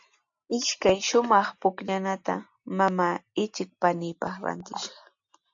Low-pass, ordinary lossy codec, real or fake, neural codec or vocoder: 7.2 kHz; AAC, 64 kbps; real; none